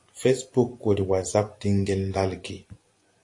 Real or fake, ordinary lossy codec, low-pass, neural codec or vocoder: fake; AAC, 48 kbps; 10.8 kHz; vocoder, 24 kHz, 100 mel bands, Vocos